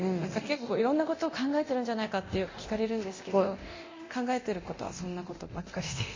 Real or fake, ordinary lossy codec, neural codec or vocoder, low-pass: fake; MP3, 32 kbps; codec, 24 kHz, 0.9 kbps, DualCodec; 7.2 kHz